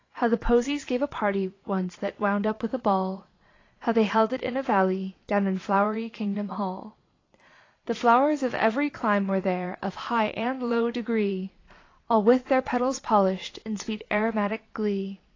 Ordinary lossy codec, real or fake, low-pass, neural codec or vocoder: AAC, 32 kbps; fake; 7.2 kHz; vocoder, 22.05 kHz, 80 mel bands, Vocos